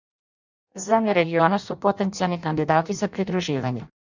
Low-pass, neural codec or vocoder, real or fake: 7.2 kHz; codec, 16 kHz in and 24 kHz out, 0.6 kbps, FireRedTTS-2 codec; fake